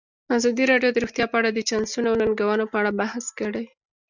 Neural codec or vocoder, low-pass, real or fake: none; 7.2 kHz; real